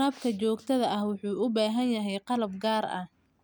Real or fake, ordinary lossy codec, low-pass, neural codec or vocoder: real; none; none; none